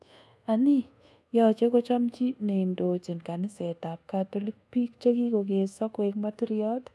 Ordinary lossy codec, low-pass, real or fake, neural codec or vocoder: none; none; fake; codec, 24 kHz, 1.2 kbps, DualCodec